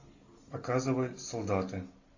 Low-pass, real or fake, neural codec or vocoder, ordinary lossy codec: 7.2 kHz; real; none; AAC, 48 kbps